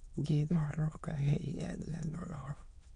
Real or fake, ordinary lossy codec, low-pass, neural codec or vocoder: fake; none; 9.9 kHz; autoencoder, 22.05 kHz, a latent of 192 numbers a frame, VITS, trained on many speakers